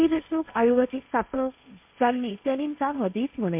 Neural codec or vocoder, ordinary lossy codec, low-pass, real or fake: codec, 16 kHz, 1.1 kbps, Voila-Tokenizer; MP3, 32 kbps; 3.6 kHz; fake